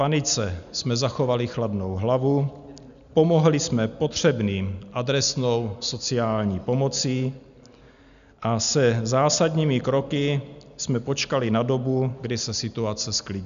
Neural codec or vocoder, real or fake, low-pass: none; real; 7.2 kHz